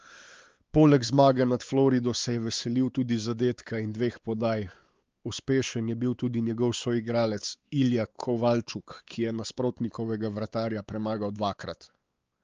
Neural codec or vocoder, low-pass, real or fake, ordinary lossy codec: codec, 16 kHz, 4 kbps, X-Codec, WavLM features, trained on Multilingual LibriSpeech; 7.2 kHz; fake; Opus, 24 kbps